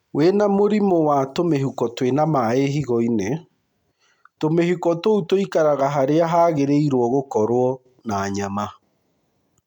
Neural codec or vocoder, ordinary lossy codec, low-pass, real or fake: none; MP3, 96 kbps; 19.8 kHz; real